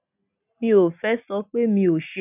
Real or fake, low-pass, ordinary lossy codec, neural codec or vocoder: real; 3.6 kHz; none; none